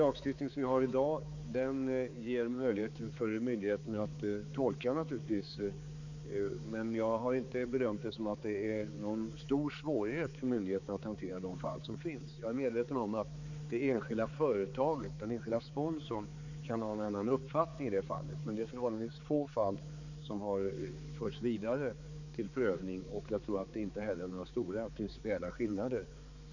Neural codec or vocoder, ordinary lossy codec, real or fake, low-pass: codec, 16 kHz, 4 kbps, X-Codec, HuBERT features, trained on balanced general audio; none; fake; 7.2 kHz